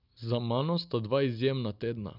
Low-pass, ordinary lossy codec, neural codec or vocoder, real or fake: 5.4 kHz; none; none; real